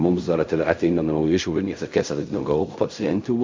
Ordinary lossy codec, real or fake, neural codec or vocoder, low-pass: MP3, 64 kbps; fake; codec, 16 kHz in and 24 kHz out, 0.4 kbps, LongCat-Audio-Codec, fine tuned four codebook decoder; 7.2 kHz